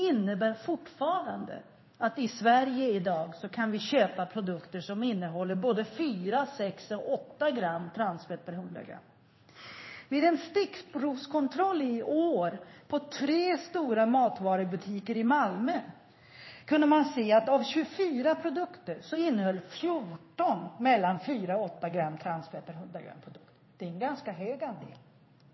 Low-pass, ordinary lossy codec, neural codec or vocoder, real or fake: 7.2 kHz; MP3, 24 kbps; codec, 16 kHz in and 24 kHz out, 1 kbps, XY-Tokenizer; fake